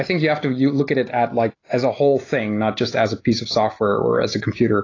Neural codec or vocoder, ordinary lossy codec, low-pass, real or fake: none; AAC, 32 kbps; 7.2 kHz; real